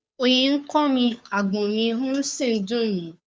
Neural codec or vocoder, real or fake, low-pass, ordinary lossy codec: codec, 16 kHz, 2 kbps, FunCodec, trained on Chinese and English, 25 frames a second; fake; none; none